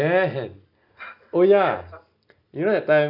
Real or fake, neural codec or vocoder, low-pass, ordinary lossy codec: real; none; 5.4 kHz; none